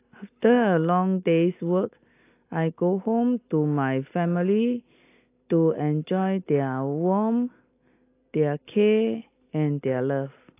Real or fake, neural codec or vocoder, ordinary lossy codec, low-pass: real; none; AAC, 24 kbps; 3.6 kHz